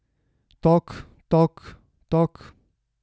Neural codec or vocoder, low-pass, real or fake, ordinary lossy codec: none; none; real; none